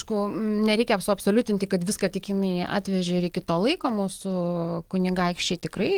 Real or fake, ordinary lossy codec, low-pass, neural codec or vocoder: fake; Opus, 24 kbps; 19.8 kHz; codec, 44.1 kHz, 7.8 kbps, DAC